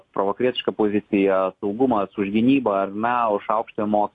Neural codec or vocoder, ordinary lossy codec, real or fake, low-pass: none; AAC, 48 kbps; real; 10.8 kHz